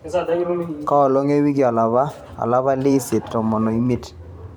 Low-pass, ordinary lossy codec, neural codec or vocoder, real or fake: 19.8 kHz; none; vocoder, 44.1 kHz, 128 mel bands every 512 samples, BigVGAN v2; fake